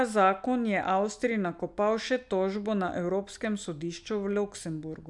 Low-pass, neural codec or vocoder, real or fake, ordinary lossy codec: 10.8 kHz; none; real; none